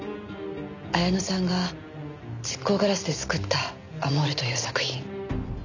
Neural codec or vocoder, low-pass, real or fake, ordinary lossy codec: none; 7.2 kHz; real; none